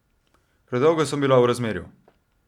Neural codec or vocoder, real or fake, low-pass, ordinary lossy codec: none; real; 19.8 kHz; Opus, 64 kbps